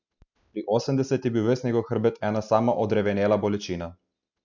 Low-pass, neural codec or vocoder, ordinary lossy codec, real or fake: 7.2 kHz; none; none; real